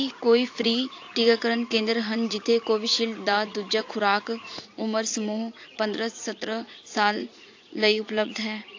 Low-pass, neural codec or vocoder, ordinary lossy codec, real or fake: 7.2 kHz; vocoder, 44.1 kHz, 80 mel bands, Vocos; AAC, 48 kbps; fake